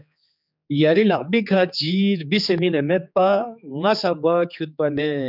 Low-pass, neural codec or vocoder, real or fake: 5.4 kHz; codec, 16 kHz, 4 kbps, X-Codec, HuBERT features, trained on general audio; fake